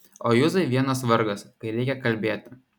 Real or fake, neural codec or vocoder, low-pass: real; none; 19.8 kHz